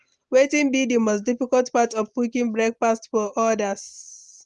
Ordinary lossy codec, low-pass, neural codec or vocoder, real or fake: Opus, 24 kbps; 10.8 kHz; none; real